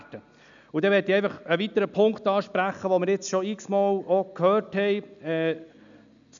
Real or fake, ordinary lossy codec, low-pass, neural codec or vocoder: real; none; 7.2 kHz; none